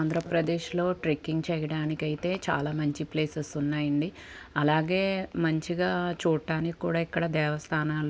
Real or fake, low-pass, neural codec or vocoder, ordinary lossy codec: real; none; none; none